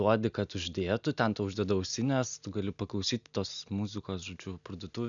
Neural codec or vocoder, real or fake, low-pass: none; real; 7.2 kHz